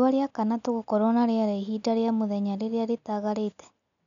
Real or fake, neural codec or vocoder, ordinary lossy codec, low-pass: real; none; none; 7.2 kHz